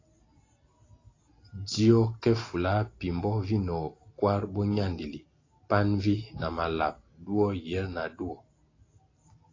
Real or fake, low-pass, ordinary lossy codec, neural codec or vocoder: real; 7.2 kHz; AAC, 32 kbps; none